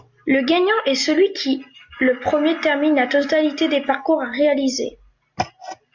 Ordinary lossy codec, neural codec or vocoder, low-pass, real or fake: MP3, 64 kbps; none; 7.2 kHz; real